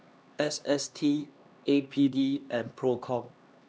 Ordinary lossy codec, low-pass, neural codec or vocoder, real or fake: none; none; codec, 16 kHz, 2 kbps, X-Codec, HuBERT features, trained on LibriSpeech; fake